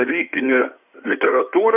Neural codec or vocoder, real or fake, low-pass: codec, 16 kHz in and 24 kHz out, 2.2 kbps, FireRedTTS-2 codec; fake; 3.6 kHz